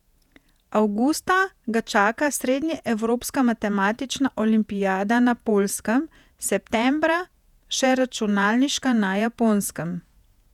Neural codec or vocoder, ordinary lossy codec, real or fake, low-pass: vocoder, 48 kHz, 128 mel bands, Vocos; none; fake; 19.8 kHz